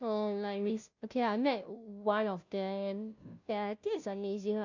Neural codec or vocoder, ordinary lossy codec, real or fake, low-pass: codec, 16 kHz, 0.5 kbps, FunCodec, trained on Chinese and English, 25 frames a second; none; fake; 7.2 kHz